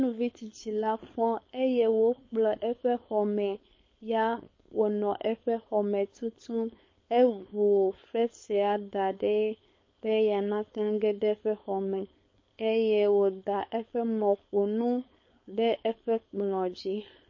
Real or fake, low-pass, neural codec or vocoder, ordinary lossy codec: fake; 7.2 kHz; codec, 16 kHz, 4.8 kbps, FACodec; MP3, 32 kbps